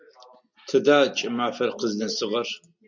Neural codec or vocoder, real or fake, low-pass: none; real; 7.2 kHz